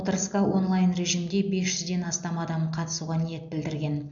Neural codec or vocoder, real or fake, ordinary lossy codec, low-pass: none; real; none; 7.2 kHz